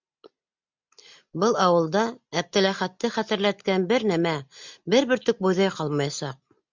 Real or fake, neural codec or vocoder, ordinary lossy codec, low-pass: real; none; MP3, 64 kbps; 7.2 kHz